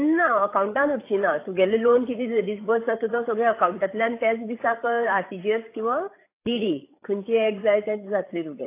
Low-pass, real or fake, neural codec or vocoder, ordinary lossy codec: 3.6 kHz; fake; codec, 16 kHz, 8 kbps, FreqCodec, larger model; AAC, 24 kbps